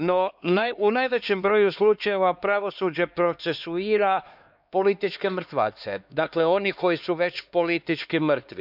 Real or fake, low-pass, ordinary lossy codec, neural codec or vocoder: fake; 5.4 kHz; none; codec, 16 kHz, 2 kbps, X-Codec, HuBERT features, trained on LibriSpeech